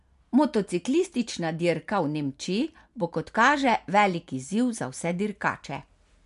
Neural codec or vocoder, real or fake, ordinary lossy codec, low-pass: none; real; MP3, 64 kbps; 10.8 kHz